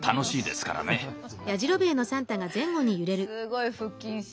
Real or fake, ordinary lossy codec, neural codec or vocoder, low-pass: real; none; none; none